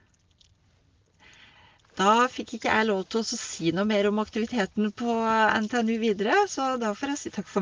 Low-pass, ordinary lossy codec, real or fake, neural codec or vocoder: 7.2 kHz; Opus, 32 kbps; real; none